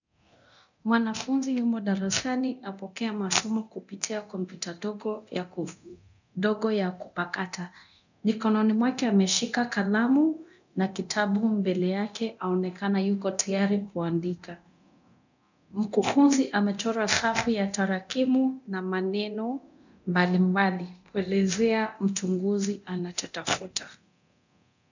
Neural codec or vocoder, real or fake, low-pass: codec, 24 kHz, 0.9 kbps, DualCodec; fake; 7.2 kHz